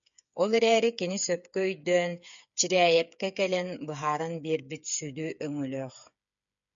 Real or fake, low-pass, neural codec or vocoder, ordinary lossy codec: fake; 7.2 kHz; codec, 16 kHz, 8 kbps, FreqCodec, smaller model; MP3, 64 kbps